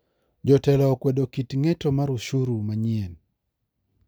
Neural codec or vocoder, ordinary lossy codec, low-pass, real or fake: none; none; none; real